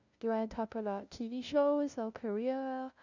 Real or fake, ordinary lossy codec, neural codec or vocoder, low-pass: fake; Opus, 64 kbps; codec, 16 kHz, 0.5 kbps, FunCodec, trained on LibriTTS, 25 frames a second; 7.2 kHz